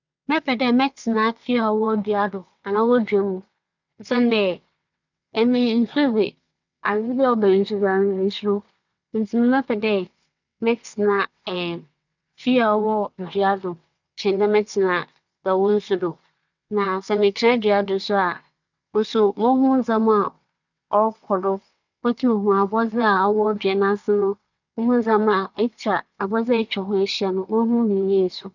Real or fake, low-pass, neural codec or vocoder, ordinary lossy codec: fake; 7.2 kHz; vocoder, 22.05 kHz, 80 mel bands, WaveNeXt; none